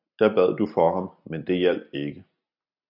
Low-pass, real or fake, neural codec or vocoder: 5.4 kHz; real; none